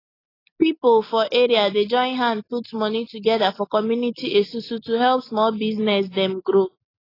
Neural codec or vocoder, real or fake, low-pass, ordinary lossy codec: none; real; 5.4 kHz; AAC, 24 kbps